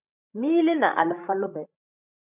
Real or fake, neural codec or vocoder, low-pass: fake; codec, 16 kHz, 16 kbps, FreqCodec, larger model; 3.6 kHz